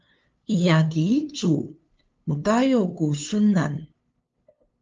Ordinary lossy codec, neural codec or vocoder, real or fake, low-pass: Opus, 24 kbps; codec, 16 kHz, 2 kbps, FunCodec, trained on LibriTTS, 25 frames a second; fake; 7.2 kHz